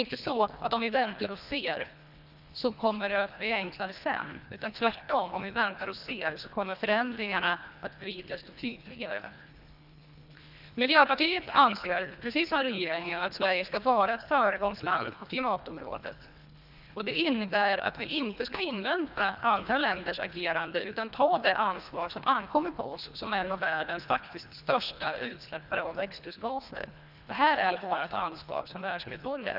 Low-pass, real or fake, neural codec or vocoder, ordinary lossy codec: 5.4 kHz; fake; codec, 24 kHz, 1.5 kbps, HILCodec; none